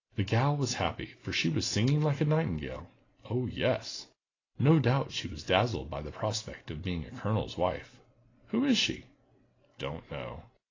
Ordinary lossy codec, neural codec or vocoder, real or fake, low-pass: AAC, 32 kbps; none; real; 7.2 kHz